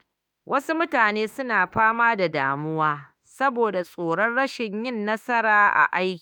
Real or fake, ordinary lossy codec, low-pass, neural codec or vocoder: fake; none; none; autoencoder, 48 kHz, 32 numbers a frame, DAC-VAE, trained on Japanese speech